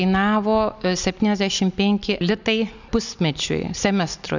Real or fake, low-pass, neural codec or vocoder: real; 7.2 kHz; none